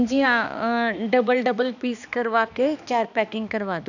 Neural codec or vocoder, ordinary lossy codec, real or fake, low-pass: codec, 16 kHz, 2 kbps, X-Codec, HuBERT features, trained on balanced general audio; none; fake; 7.2 kHz